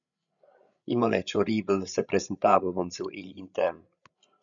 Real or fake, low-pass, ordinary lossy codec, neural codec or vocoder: fake; 7.2 kHz; MP3, 96 kbps; codec, 16 kHz, 16 kbps, FreqCodec, larger model